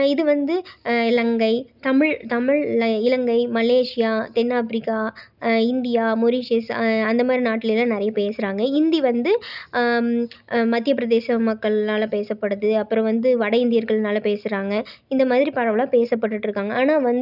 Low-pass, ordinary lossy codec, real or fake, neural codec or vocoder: 5.4 kHz; none; real; none